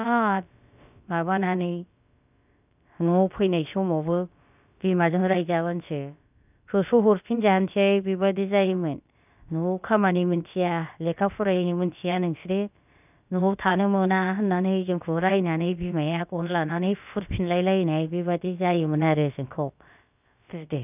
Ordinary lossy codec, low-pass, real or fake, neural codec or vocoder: none; 3.6 kHz; fake; codec, 16 kHz, about 1 kbps, DyCAST, with the encoder's durations